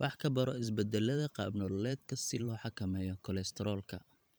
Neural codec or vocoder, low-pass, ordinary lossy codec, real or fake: vocoder, 44.1 kHz, 128 mel bands every 512 samples, BigVGAN v2; none; none; fake